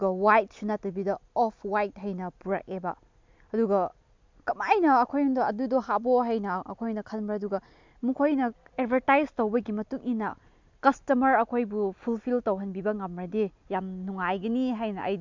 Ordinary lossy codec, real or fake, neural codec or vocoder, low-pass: MP3, 64 kbps; real; none; 7.2 kHz